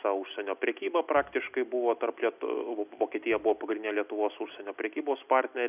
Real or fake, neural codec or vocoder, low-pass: real; none; 3.6 kHz